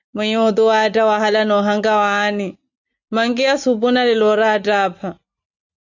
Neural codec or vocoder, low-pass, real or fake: none; 7.2 kHz; real